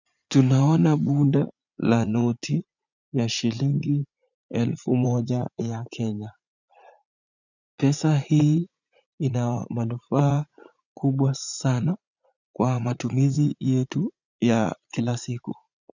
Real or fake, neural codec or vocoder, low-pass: fake; vocoder, 44.1 kHz, 80 mel bands, Vocos; 7.2 kHz